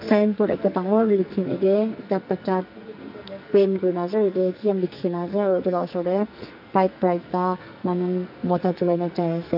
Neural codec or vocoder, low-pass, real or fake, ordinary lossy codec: codec, 44.1 kHz, 2.6 kbps, SNAC; 5.4 kHz; fake; none